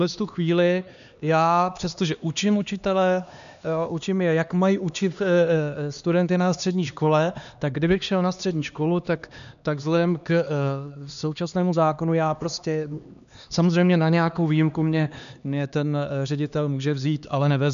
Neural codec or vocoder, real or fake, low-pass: codec, 16 kHz, 2 kbps, X-Codec, HuBERT features, trained on LibriSpeech; fake; 7.2 kHz